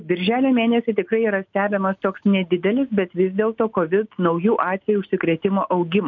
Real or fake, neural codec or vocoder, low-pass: real; none; 7.2 kHz